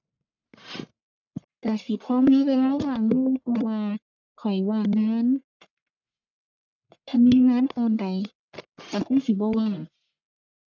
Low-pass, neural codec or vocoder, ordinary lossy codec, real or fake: 7.2 kHz; codec, 44.1 kHz, 1.7 kbps, Pupu-Codec; none; fake